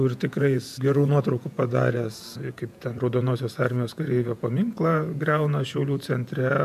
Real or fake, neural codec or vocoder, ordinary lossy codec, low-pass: fake; vocoder, 48 kHz, 128 mel bands, Vocos; MP3, 96 kbps; 14.4 kHz